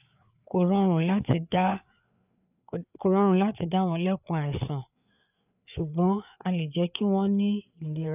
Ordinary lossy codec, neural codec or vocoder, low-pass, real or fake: none; codec, 16 kHz, 4 kbps, FreqCodec, larger model; 3.6 kHz; fake